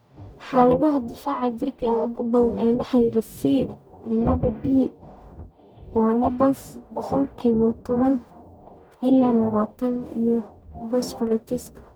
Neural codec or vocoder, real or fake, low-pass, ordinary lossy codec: codec, 44.1 kHz, 0.9 kbps, DAC; fake; none; none